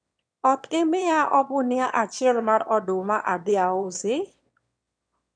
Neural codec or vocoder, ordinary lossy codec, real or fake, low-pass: autoencoder, 22.05 kHz, a latent of 192 numbers a frame, VITS, trained on one speaker; none; fake; 9.9 kHz